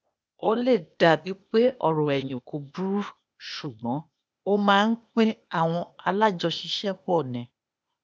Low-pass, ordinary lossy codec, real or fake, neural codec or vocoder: none; none; fake; codec, 16 kHz, 0.8 kbps, ZipCodec